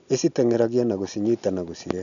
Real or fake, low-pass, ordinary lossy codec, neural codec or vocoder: real; 7.2 kHz; none; none